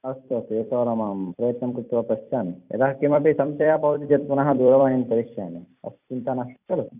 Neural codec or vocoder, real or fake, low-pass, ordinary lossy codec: vocoder, 44.1 kHz, 128 mel bands every 256 samples, BigVGAN v2; fake; 3.6 kHz; none